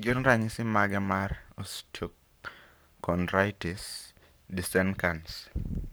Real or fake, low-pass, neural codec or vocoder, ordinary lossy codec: fake; none; codec, 44.1 kHz, 7.8 kbps, Pupu-Codec; none